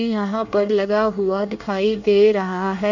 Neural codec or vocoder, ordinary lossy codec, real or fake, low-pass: codec, 24 kHz, 1 kbps, SNAC; none; fake; 7.2 kHz